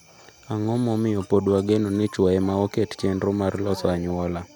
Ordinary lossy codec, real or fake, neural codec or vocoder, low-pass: none; real; none; 19.8 kHz